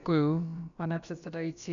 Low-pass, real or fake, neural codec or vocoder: 7.2 kHz; fake; codec, 16 kHz, about 1 kbps, DyCAST, with the encoder's durations